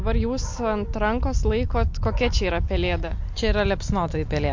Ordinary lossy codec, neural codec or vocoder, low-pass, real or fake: MP3, 48 kbps; none; 7.2 kHz; real